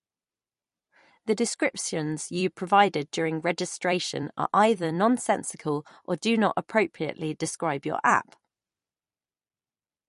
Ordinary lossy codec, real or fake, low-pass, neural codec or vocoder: MP3, 48 kbps; fake; 14.4 kHz; vocoder, 44.1 kHz, 128 mel bands every 256 samples, BigVGAN v2